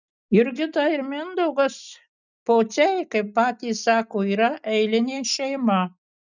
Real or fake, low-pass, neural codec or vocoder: real; 7.2 kHz; none